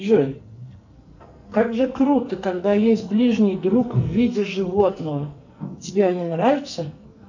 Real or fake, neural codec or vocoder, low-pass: fake; codec, 44.1 kHz, 2.6 kbps, SNAC; 7.2 kHz